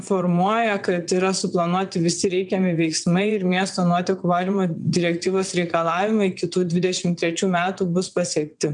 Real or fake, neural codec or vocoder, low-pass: fake; vocoder, 22.05 kHz, 80 mel bands, WaveNeXt; 9.9 kHz